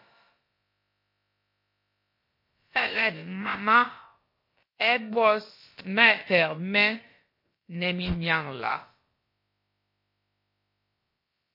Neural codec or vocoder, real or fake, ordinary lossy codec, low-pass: codec, 16 kHz, about 1 kbps, DyCAST, with the encoder's durations; fake; MP3, 32 kbps; 5.4 kHz